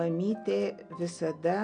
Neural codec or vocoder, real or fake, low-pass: none; real; 9.9 kHz